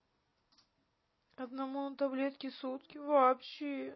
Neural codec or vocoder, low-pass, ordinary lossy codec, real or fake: none; 7.2 kHz; MP3, 24 kbps; real